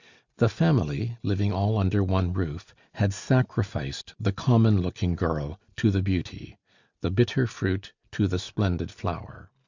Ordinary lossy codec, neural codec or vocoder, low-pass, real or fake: Opus, 64 kbps; none; 7.2 kHz; real